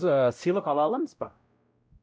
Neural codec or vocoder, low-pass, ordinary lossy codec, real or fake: codec, 16 kHz, 0.5 kbps, X-Codec, WavLM features, trained on Multilingual LibriSpeech; none; none; fake